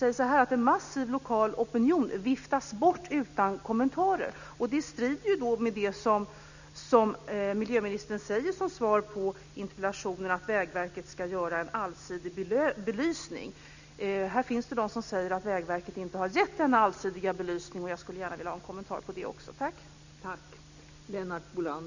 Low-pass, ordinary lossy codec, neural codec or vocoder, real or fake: 7.2 kHz; none; none; real